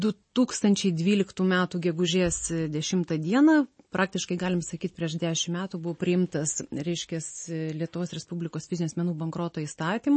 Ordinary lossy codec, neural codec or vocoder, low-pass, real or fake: MP3, 32 kbps; none; 9.9 kHz; real